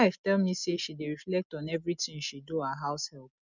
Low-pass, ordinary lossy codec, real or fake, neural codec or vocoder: 7.2 kHz; none; real; none